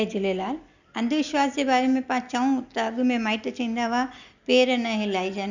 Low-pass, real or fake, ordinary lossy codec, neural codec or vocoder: 7.2 kHz; real; none; none